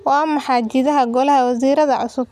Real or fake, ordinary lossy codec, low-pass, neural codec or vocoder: real; none; 14.4 kHz; none